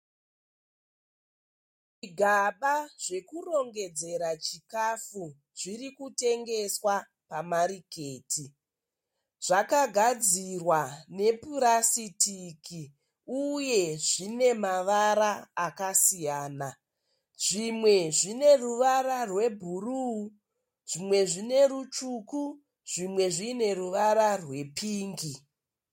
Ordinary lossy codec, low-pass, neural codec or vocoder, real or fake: MP3, 64 kbps; 19.8 kHz; none; real